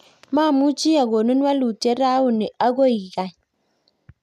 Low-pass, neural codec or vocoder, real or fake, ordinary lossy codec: 14.4 kHz; none; real; none